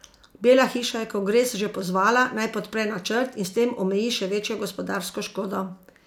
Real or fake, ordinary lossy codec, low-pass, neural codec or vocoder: real; none; 19.8 kHz; none